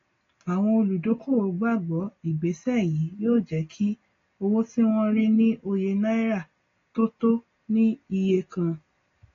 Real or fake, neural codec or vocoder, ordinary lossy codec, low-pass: real; none; AAC, 32 kbps; 7.2 kHz